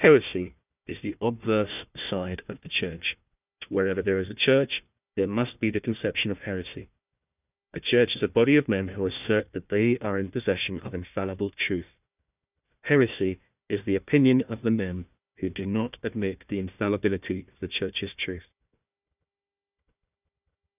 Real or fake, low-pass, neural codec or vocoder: fake; 3.6 kHz; codec, 16 kHz, 1 kbps, FunCodec, trained on Chinese and English, 50 frames a second